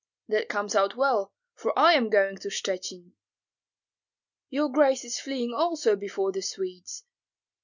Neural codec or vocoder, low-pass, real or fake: none; 7.2 kHz; real